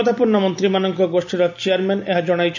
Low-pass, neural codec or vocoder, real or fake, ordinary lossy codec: 7.2 kHz; none; real; none